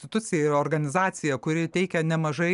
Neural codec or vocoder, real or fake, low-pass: none; real; 10.8 kHz